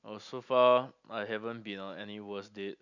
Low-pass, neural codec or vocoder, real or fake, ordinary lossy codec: 7.2 kHz; none; real; none